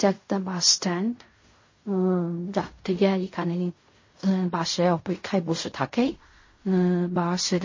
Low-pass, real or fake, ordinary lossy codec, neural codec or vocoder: 7.2 kHz; fake; MP3, 32 kbps; codec, 16 kHz in and 24 kHz out, 0.4 kbps, LongCat-Audio-Codec, fine tuned four codebook decoder